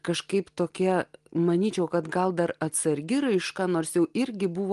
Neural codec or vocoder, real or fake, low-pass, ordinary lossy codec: none; real; 10.8 kHz; Opus, 24 kbps